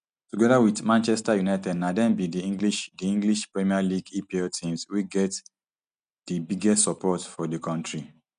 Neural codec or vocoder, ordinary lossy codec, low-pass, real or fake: none; none; 9.9 kHz; real